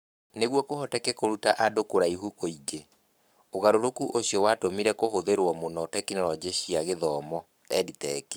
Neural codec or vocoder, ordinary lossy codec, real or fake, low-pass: codec, 44.1 kHz, 7.8 kbps, Pupu-Codec; none; fake; none